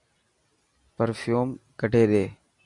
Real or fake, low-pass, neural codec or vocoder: fake; 10.8 kHz; vocoder, 44.1 kHz, 128 mel bands every 256 samples, BigVGAN v2